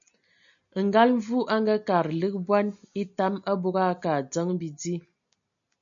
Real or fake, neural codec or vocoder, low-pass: real; none; 7.2 kHz